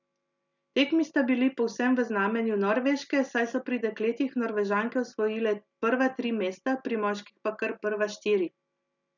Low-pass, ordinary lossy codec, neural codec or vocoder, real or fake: 7.2 kHz; none; none; real